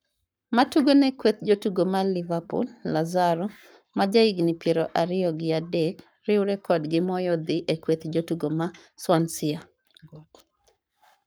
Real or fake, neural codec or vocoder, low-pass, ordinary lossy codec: fake; codec, 44.1 kHz, 7.8 kbps, Pupu-Codec; none; none